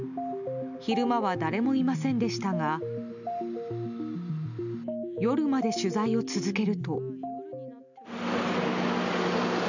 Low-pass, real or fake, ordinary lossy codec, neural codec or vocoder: 7.2 kHz; real; none; none